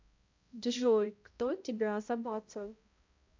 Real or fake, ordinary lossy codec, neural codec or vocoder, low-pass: fake; MP3, 64 kbps; codec, 16 kHz, 0.5 kbps, X-Codec, HuBERT features, trained on balanced general audio; 7.2 kHz